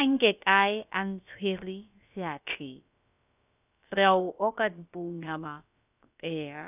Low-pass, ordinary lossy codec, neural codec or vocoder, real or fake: 3.6 kHz; none; codec, 16 kHz, about 1 kbps, DyCAST, with the encoder's durations; fake